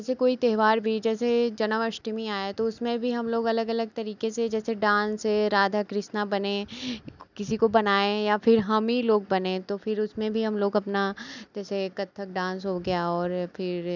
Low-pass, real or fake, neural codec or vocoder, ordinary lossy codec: 7.2 kHz; real; none; none